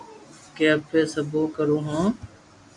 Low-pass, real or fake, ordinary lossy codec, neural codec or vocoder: 10.8 kHz; real; AAC, 64 kbps; none